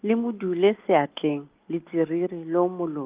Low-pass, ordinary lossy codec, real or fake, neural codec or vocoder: 3.6 kHz; Opus, 32 kbps; real; none